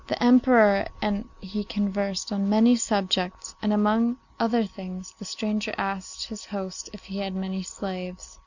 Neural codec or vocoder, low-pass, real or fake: none; 7.2 kHz; real